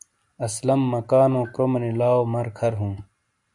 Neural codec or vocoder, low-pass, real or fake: none; 10.8 kHz; real